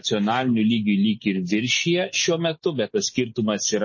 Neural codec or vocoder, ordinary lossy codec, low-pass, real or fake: none; MP3, 32 kbps; 7.2 kHz; real